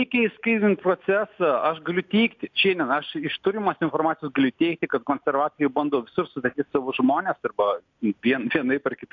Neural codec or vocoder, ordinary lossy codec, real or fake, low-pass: none; AAC, 48 kbps; real; 7.2 kHz